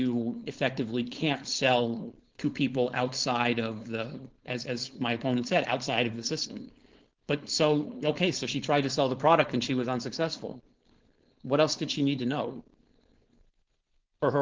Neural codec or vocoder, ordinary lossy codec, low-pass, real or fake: codec, 16 kHz, 4.8 kbps, FACodec; Opus, 16 kbps; 7.2 kHz; fake